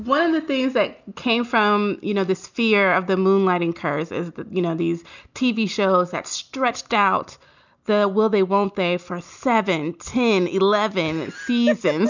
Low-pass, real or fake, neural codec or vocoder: 7.2 kHz; real; none